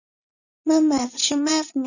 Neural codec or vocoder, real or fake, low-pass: vocoder, 44.1 kHz, 80 mel bands, Vocos; fake; 7.2 kHz